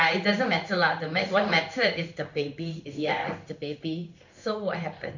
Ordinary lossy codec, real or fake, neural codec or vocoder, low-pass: none; fake; codec, 16 kHz in and 24 kHz out, 1 kbps, XY-Tokenizer; 7.2 kHz